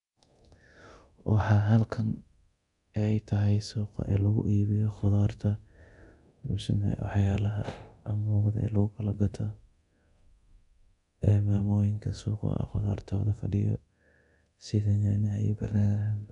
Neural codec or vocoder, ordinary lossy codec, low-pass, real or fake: codec, 24 kHz, 0.9 kbps, DualCodec; none; 10.8 kHz; fake